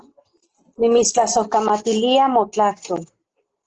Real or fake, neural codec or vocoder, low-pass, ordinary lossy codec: real; none; 7.2 kHz; Opus, 16 kbps